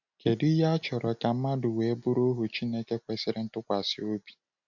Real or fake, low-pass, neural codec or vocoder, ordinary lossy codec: real; 7.2 kHz; none; none